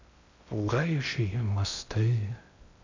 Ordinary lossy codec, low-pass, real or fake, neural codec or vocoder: MP3, 64 kbps; 7.2 kHz; fake; codec, 16 kHz in and 24 kHz out, 0.6 kbps, FocalCodec, streaming, 2048 codes